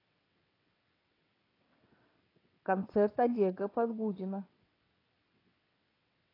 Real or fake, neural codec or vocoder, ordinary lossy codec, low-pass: fake; vocoder, 22.05 kHz, 80 mel bands, WaveNeXt; AAC, 24 kbps; 5.4 kHz